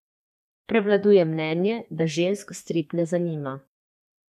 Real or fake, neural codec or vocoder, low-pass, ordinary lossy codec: fake; codec, 32 kHz, 1.9 kbps, SNAC; 14.4 kHz; none